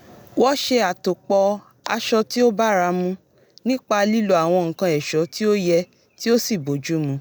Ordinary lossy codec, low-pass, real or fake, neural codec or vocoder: none; none; real; none